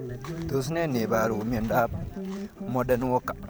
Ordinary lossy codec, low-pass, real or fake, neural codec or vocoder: none; none; fake; vocoder, 44.1 kHz, 128 mel bands every 512 samples, BigVGAN v2